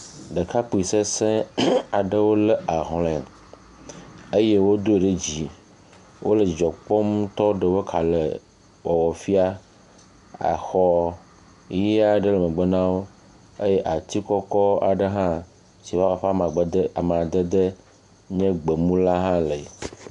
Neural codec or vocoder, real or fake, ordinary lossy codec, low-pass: none; real; AAC, 96 kbps; 10.8 kHz